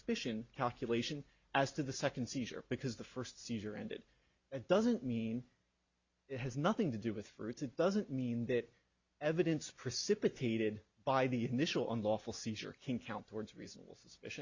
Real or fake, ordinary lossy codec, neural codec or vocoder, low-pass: real; Opus, 64 kbps; none; 7.2 kHz